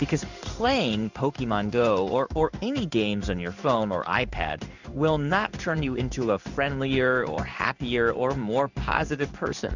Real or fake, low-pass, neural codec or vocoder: fake; 7.2 kHz; codec, 16 kHz in and 24 kHz out, 1 kbps, XY-Tokenizer